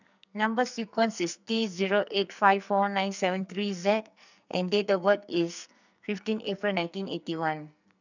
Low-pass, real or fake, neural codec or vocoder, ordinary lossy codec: 7.2 kHz; fake; codec, 44.1 kHz, 2.6 kbps, SNAC; none